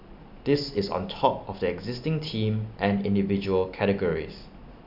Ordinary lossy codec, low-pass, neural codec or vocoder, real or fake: none; 5.4 kHz; none; real